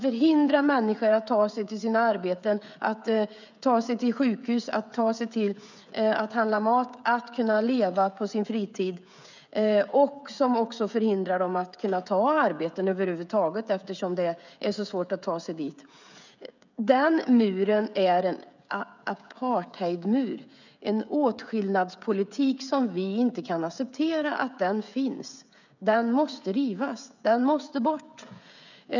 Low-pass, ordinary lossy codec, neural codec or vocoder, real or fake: 7.2 kHz; none; codec, 16 kHz, 16 kbps, FreqCodec, smaller model; fake